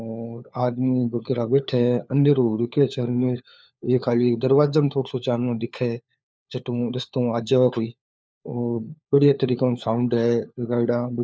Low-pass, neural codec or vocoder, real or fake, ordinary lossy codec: none; codec, 16 kHz, 4 kbps, FunCodec, trained on LibriTTS, 50 frames a second; fake; none